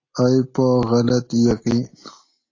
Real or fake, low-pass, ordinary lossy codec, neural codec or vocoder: real; 7.2 kHz; AAC, 32 kbps; none